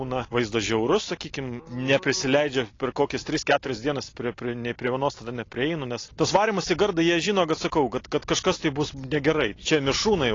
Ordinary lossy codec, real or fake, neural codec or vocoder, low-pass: AAC, 32 kbps; real; none; 7.2 kHz